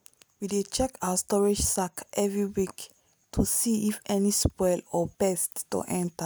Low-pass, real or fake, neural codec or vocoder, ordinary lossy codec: none; real; none; none